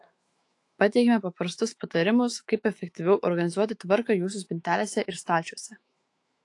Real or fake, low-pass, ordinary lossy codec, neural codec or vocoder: fake; 10.8 kHz; AAC, 48 kbps; autoencoder, 48 kHz, 128 numbers a frame, DAC-VAE, trained on Japanese speech